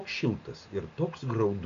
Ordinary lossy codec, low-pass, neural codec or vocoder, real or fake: AAC, 64 kbps; 7.2 kHz; none; real